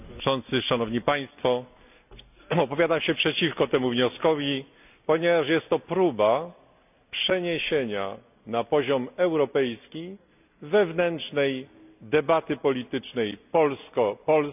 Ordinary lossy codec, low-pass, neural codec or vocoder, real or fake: none; 3.6 kHz; none; real